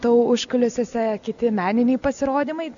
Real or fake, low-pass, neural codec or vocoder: real; 7.2 kHz; none